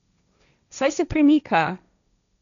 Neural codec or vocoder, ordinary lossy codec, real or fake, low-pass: codec, 16 kHz, 1.1 kbps, Voila-Tokenizer; none; fake; 7.2 kHz